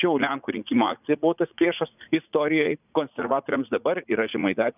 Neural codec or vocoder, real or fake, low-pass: vocoder, 44.1 kHz, 80 mel bands, Vocos; fake; 3.6 kHz